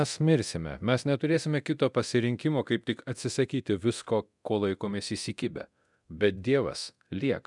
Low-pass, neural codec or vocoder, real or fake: 10.8 kHz; codec, 24 kHz, 0.9 kbps, DualCodec; fake